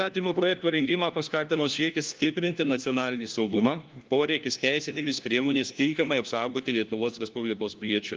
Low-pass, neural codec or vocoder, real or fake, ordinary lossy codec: 7.2 kHz; codec, 16 kHz, 1 kbps, FunCodec, trained on LibriTTS, 50 frames a second; fake; Opus, 32 kbps